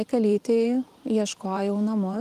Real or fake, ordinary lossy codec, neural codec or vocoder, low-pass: fake; Opus, 32 kbps; vocoder, 44.1 kHz, 128 mel bands every 512 samples, BigVGAN v2; 14.4 kHz